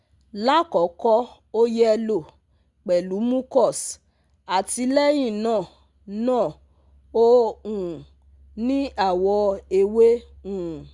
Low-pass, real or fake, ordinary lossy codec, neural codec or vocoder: 10.8 kHz; real; Opus, 64 kbps; none